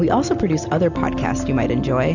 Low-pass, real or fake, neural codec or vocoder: 7.2 kHz; real; none